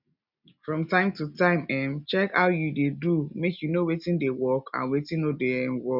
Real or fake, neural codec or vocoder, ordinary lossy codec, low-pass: real; none; none; 5.4 kHz